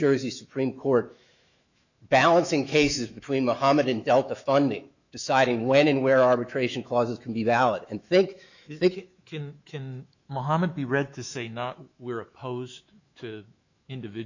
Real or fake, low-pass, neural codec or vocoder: fake; 7.2 kHz; autoencoder, 48 kHz, 128 numbers a frame, DAC-VAE, trained on Japanese speech